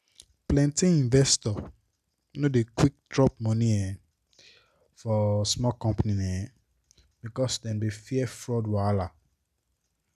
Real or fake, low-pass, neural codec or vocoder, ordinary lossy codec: real; 14.4 kHz; none; none